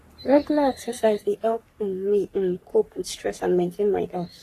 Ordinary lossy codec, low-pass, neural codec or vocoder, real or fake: AAC, 48 kbps; 14.4 kHz; codec, 44.1 kHz, 3.4 kbps, Pupu-Codec; fake